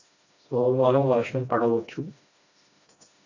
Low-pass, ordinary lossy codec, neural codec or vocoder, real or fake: 7.2 kHz; AAC, 48 kbps; codec, 16 kHz, 1 kbps, FreqCodec, smaller model; fake